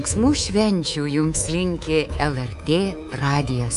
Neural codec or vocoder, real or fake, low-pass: codec, 24 kHz, 3.1 kbps, DualCodec; fake; 10.8 kHz